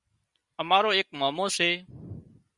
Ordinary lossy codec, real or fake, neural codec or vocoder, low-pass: Opus, 64 kbps; fake; vocoder, 44.1 kHz, 128 mel bands every 256 samples, BigVGAN v2; 10.8 kHz